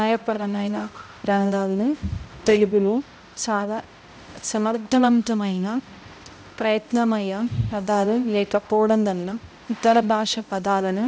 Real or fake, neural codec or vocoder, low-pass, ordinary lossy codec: fake; codec, 16 kHz, 0.5 kbps, X-Codec, HuBERT features, trained on balanced general audio; none; none